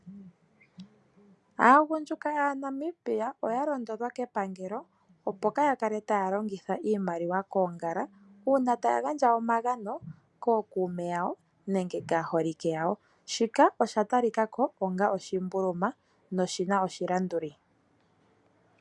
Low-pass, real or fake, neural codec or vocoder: 10.8 kHz; real; none